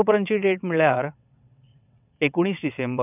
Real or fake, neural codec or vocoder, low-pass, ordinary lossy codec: fake; vocoder, 22.05 kHz, 80 mel bands, WaveNeXt; 3.6 kHz; none